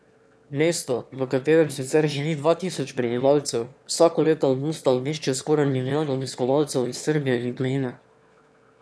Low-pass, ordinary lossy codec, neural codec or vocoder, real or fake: none; none; autoencoder, 22.05 kHz, a latent of 192 numbers a frame, VITS, trained on one speaker; fake